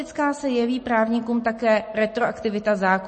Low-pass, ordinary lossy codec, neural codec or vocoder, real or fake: 10.8 kHz; MP3, 32 kbps; none; real